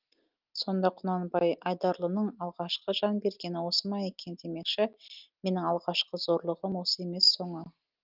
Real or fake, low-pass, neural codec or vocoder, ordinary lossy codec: real; 5.4 kHz; none; Opus, 24 kbps